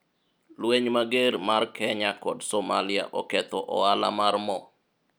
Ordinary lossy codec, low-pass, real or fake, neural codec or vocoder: none; none; real; none